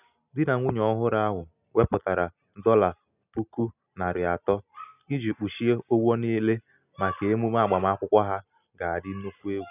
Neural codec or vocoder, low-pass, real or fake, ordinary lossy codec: none; 3.6 kHz; real; none